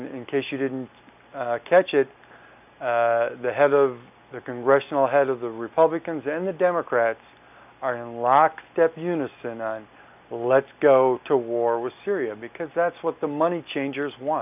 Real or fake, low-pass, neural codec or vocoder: real; 3.6 kHz; none